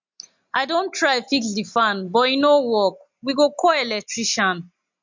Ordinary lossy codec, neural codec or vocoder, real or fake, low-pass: MP3, 64 kbps; none; real; 7.2 kHz